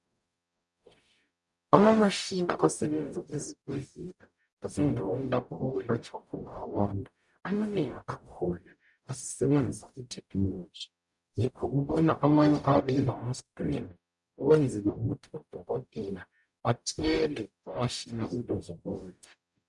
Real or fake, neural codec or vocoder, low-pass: fake; codec, 44.1 kHz, 0.9 kbps, DAC; 10.8 kHz